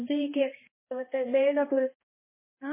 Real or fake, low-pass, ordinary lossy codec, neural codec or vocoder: fake; 3.6 kHz; MP3, 16 kbps; codec, 16 kHz, 1 kbps, X-Codec, HuBERT features, trained on balanced general audio